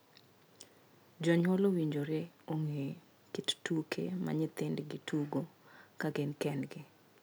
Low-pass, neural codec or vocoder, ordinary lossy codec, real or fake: none; none; none; real